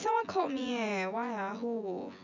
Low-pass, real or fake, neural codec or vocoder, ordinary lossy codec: 7.2 kHz; fake; vocoder, 24 kHz, 100 mel bands, Vocos; none